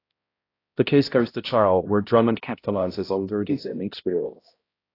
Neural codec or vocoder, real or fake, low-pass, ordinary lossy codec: codec, 16 kHz, 0.5 kbps, X-Codec, HuBERT features, trained on balanced general audio; fake; 5.4 kHz; AAC, 32 kbps